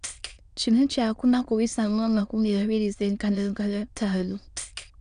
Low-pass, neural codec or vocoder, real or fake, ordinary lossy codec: 9.9 kHz; autoencoder, 22.05 kHz, a latent of 192 numbers a frame, VITS, trained on many speakers; fake; none